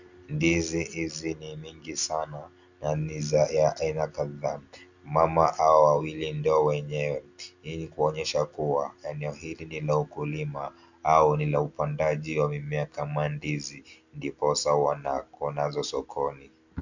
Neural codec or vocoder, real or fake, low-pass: none; real; 7.2 kHz